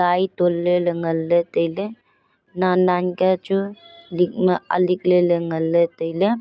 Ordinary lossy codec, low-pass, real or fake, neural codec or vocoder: none; none; real; none